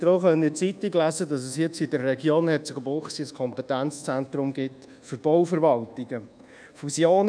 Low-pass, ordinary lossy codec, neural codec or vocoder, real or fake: 9.9 kHz; none; codec, 24 kHz, 1.2 kbps, DualCodec; fake